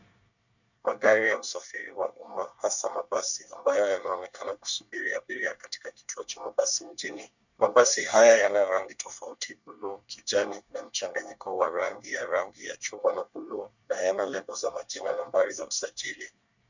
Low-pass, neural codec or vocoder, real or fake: 7.2 kHz; codec, 24 kHz, 1 kbps, SNAC; fake